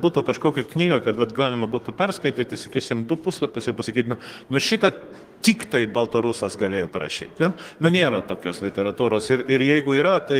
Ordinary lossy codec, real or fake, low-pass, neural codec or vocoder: Opus, 24 kbps; fake; 14.4 kHz; codec, 32 kHz, 1.9 kbps, SNAC